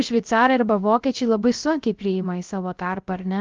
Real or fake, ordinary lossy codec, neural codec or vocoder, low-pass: fake; Opus, 32 kbps; codec, 16 kHz, 0.3 kbps, FocalCodec; 7.2 kHz